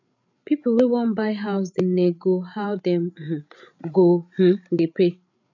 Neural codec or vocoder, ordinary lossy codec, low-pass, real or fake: codec, 16 kHz, 8 kbps, FreqCodec, larger model; none; 7.2 kHz; fake